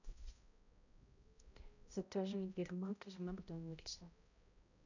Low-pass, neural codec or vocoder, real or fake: 7.2 kHz; codec, 16 kHz, 0.5 kbps, X-Codec, HuBERT features, trained on balanced general audio; fake